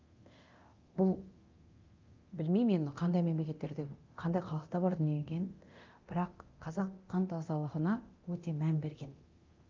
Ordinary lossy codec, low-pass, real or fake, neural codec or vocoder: Opus, 32 kbps; 7.2 kHz; fake; codec, 24 kHz, 0.9 kbps, DualCodec